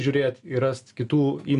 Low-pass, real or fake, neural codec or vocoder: 10.8 kHz; real; none